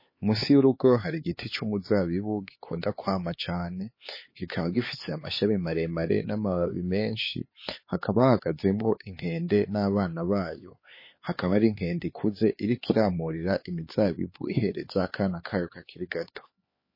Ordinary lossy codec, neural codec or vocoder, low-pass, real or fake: MP3, 24 kbps; codec, 16 kHz, 4 kbps, X-Codec, HuBERT features, trained on LibriSpeech; 5.4 kHz; fake